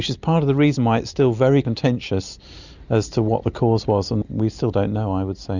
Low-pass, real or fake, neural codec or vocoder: 7.2 kHz; real; none